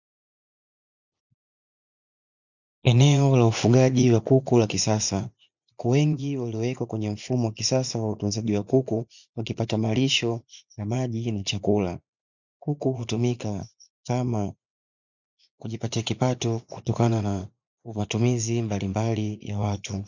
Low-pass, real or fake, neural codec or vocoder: 7.2 kHz; fake; vocoder, 44.1 kHz, 80 mel bands, Vocos